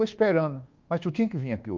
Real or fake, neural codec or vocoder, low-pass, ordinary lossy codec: fake; codec, 24 kHz, 1.2 kbps, DualCodec; 7.2 kHz; Opus, 24 kbps